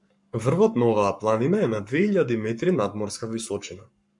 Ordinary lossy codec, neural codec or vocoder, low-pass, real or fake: MP3, 64 kbps; codec, 44.1 kHz, 7.8 kbps, Pupu-Codec; 10.8 kHz; fake